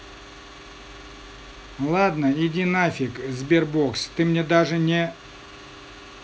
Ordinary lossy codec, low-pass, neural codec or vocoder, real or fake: none; none; none; real